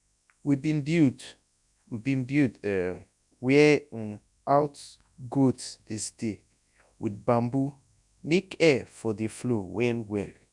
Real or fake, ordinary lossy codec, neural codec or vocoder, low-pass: fake; none; codec, 24 kHz, 0.9 kbps, WavTokenizer, large speech release; 10.8 kHz